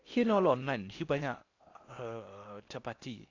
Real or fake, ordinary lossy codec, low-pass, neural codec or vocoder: fake; AAC, 48 kbps; 7.2 kHz; codec, 16 kHz in and 24 kHz out, 0.8 kbps, FocalCodec, streaming, 65536 codes